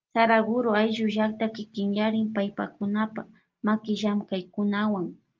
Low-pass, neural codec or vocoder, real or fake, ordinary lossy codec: 7.2 kHz; none; real; Opus, 24 kbps